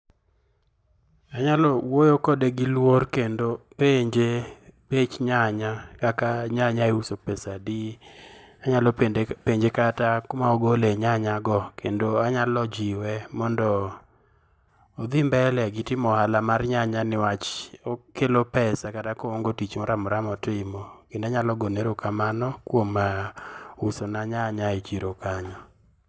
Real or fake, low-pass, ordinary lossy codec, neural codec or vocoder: real; none; none; none